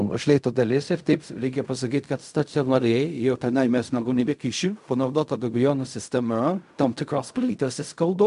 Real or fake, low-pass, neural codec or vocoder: fake; 10.8 kHz; codec, 16 kHz in and 24 kHz out, 0.4 kbps, LongCat-Audio-Codec, fine tuned four codebook decoder